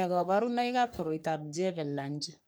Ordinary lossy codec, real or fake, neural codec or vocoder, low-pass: none; fake; codec, 44.1 kHz, 3.4 kbps, Pupu-Codec; none